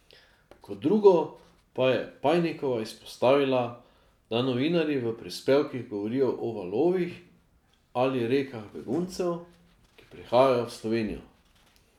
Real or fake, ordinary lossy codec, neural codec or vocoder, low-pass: real; none; none; 19.8 kHz